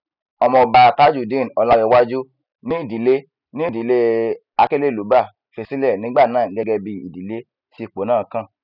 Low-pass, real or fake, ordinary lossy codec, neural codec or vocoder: 5.4 kHz; real; none; none